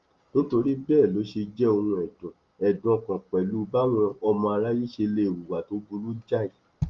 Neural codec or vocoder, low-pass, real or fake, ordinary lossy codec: none; 7.2 kHz; real; Opus, 32 kbps